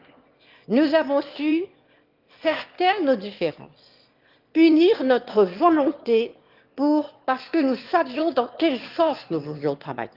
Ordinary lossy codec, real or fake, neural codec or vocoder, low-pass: Opus, 32 kbps; fake; autoencoder, 22.05 kHz, a latent of 192 numbers a frame, VITS, trained on one speaker; 5.4 kHz